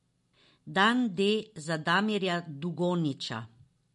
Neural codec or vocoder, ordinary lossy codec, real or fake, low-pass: none; MP3, 48 kbps; real; 14.4 kHz